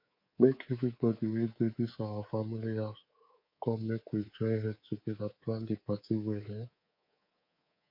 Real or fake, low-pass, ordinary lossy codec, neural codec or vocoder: fake; 5.4 kHz; MP3, 32 kbps; codec, 24 kHz, 3.1 kbps, DualCodec